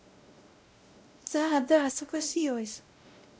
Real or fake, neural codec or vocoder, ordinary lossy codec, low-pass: fake; codec, 16 kHz, 0.5 kbps, X-Codec, WavLM features, trained on Multilingual LibriSpeech; none; none